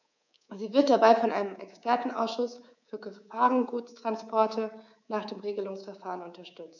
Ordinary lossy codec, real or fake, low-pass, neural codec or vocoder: none; fake; 7.2 kHz; codec, 24 kHz, 3.1 kbps, DualCodec